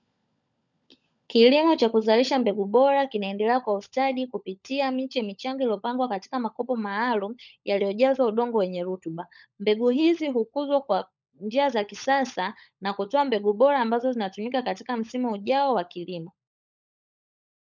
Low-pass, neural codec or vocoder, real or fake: 7.2 kHz; codec, 16 kHz, 16 kbps, FunCodec, trained on LibriTTS, 50 frames a second; fake